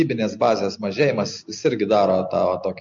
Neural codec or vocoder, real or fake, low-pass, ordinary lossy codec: none; real; 7.2 kHz; MP3, 48 kbps